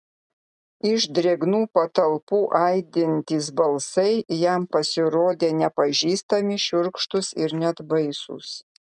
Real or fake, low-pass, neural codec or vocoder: real; 10.8 kHz; none